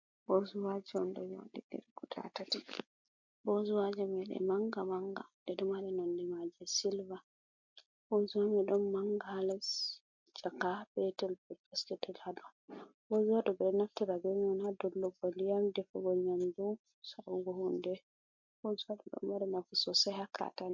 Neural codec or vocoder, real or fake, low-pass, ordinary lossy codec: none; real; 7.2 kHz; MP3, 48 kbps